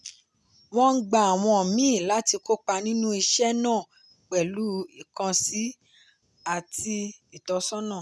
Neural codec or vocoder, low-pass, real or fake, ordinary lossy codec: none; none; real; none